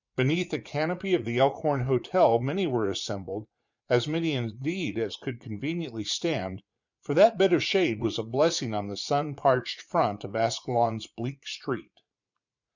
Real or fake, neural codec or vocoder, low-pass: fake; vocoder, 44.1 kHz, 80 mel bands, Vocos; 7.2 kHz